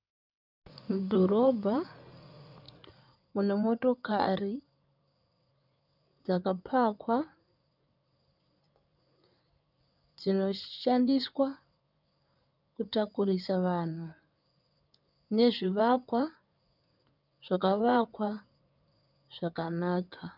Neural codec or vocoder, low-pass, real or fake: codec, 16 kHz in and 24 kHz out, 2.2 kbps, FireRedTTS-2 codec; 5.4 kHz; fake